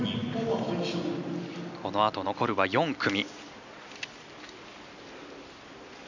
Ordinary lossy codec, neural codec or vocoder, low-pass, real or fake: none; none; 7.2 kHz; real